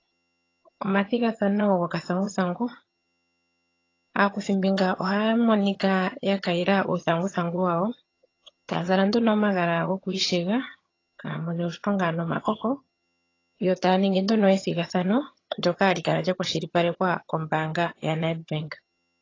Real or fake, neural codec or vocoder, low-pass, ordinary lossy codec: fake; vocoder, 22.05 kHz, 80 mel bands, HiFi-GAN; 7.2 kHz; AAC, 32 kbps